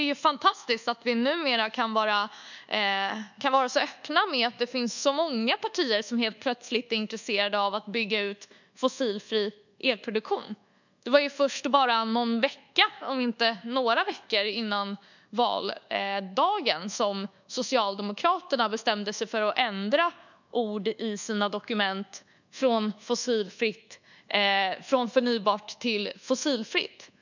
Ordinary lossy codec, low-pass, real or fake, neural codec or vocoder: none; 7.2 kHz; fake; codec, 24 kHz, 1.2 kbps, DualCodec